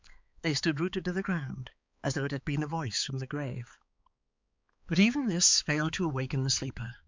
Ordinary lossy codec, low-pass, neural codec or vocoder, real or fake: MP3, 64 kbps; 7.2 kHz; codec, 16 kHz, 4 kbps, X-Codec, HuBERT features, trained on balanced general audio; fake